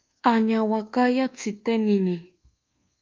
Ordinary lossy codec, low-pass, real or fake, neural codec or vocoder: Opus, 32 kbps; 7.2 kHz; fake; codec, 24 kHz, 1.2 kbps, DualCodec